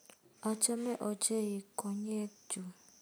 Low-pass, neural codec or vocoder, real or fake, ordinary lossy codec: none; none; real; none